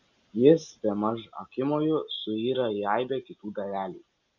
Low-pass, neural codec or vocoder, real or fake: 7.2 kHz; none; real